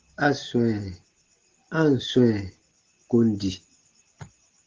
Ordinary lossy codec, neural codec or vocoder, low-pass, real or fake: Opus, 16 kbps; none; 7.2 kHz; real